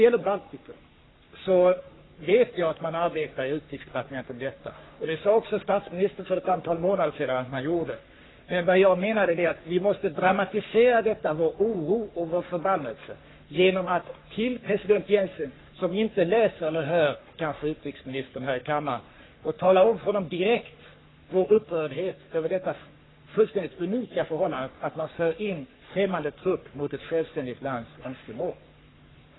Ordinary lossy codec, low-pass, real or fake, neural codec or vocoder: AAC, 16 kbps; 7.2 kHz; fake; codec, 44.1 kHz, 3.4 kbps, Pupu-Codec